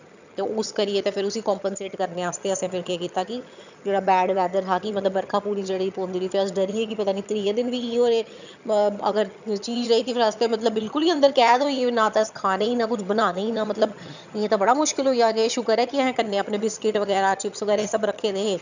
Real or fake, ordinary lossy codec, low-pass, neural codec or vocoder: fake; none; 7.2 kHz; vocoder, 22.05 kHz, 80 mel bands, HiFi-GAN